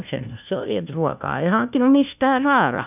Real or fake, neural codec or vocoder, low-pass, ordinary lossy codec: fake; codec, 16 kHz, 1 kbps, FunCodec, trained on LibriTTS, 50 frames a second; 3.6 kHz; none